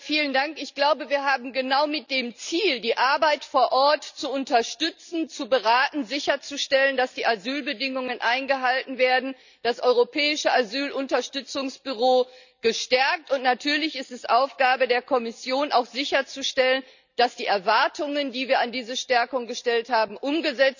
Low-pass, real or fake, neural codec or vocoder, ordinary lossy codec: 7.2 kHz; real; none; none